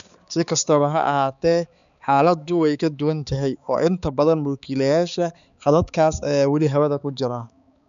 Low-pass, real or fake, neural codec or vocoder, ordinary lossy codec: 7.2 kHz; fake; codec, 16 kHz, 4 kbps, X-Codec, HuBERT features, trained on balanced general audio; none